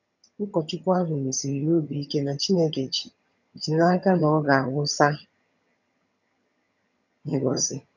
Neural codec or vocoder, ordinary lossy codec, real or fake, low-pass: vocoder, 22.05 kHz, 80 mel bands, HiFi-GAN; none; fake; 7.2 kHz